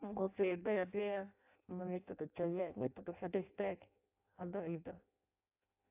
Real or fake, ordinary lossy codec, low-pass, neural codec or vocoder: fake; AAC, 32 kbps; 3.6 kHz; codec, 16 kHz in and 24 kHz out, 0.6 kbps, FireRedTTS-2 codec